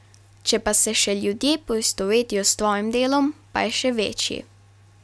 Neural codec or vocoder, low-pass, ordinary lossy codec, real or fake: none; none; none; real